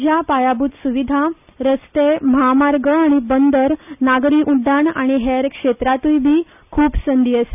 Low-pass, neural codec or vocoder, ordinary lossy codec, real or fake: 3.6 kHz; none; none; real